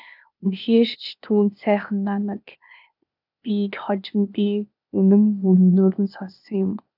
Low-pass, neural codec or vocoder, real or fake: 5.4 kHz; codec, 16 kHz, 0.8 kbps, ZipCodec; fake